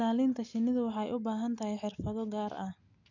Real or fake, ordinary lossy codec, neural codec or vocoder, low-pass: real; none; none; 7.2 kHz